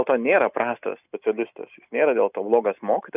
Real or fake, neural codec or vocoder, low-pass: real; none; 3.6 kHz